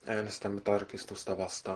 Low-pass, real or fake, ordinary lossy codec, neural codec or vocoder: 10.8 kHz; real; Opus, 16 kbps; none